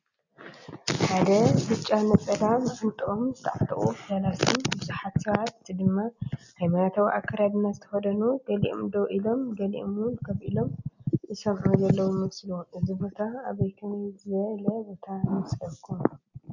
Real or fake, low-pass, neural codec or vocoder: real; 7.2 kHz; none